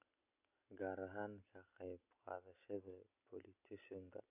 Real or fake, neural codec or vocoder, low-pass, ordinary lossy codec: real; none; 3.6 kHz; none